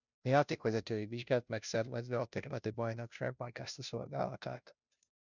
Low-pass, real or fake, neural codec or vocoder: 7.2 kHz; fake; codec, 16 kHz, 0.5 kbps, FunCodec, trained on Chinese and English, 25 frames a second